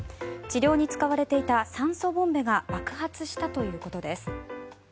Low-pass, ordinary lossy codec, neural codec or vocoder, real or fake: none; none; none; real